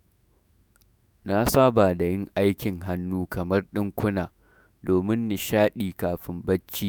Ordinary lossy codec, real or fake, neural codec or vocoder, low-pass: none; fake; autoencoder, 48 kHz, 128 numbers a frame, DAC-VAE, trained on Japanese speech; none